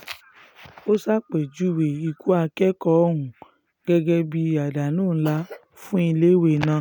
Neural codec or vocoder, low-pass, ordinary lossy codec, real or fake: none; none; none; real